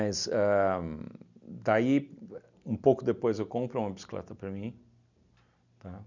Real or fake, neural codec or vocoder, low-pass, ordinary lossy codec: real; none; 7.2 kHz; none